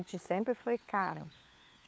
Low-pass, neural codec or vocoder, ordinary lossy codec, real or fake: none; codec, 16 kHz, 4 kbps, FunCodec, trained on LibriTTS, 50 frames a second; none; fake